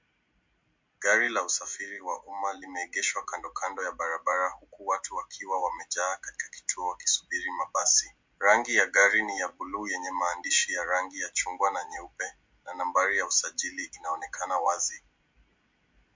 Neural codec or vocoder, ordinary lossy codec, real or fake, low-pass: none; MP3, 48 kbps; real; 7.2 kHz